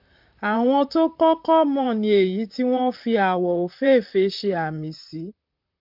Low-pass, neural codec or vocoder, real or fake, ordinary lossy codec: 5.4 kHz; vocoder, 44.1 kHz, 128 mel bands, Pupu-Vocoder; fake; MP3, 48 kbps